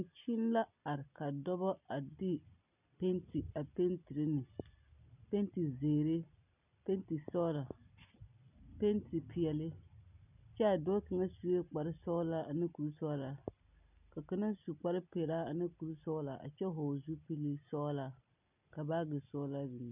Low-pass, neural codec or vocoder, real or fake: 3.6 kHz; none; real